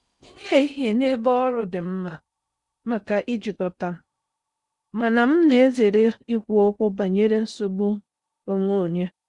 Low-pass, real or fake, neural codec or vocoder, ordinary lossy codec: 10.8 kHz; fake; codec, 16 kHz in and 24 kHz out, 0.6 kbps, FocalCodec, streaming, 4096 codes; Opus, 64 kbps